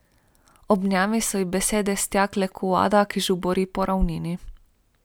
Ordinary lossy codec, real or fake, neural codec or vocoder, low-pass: none; real; none; none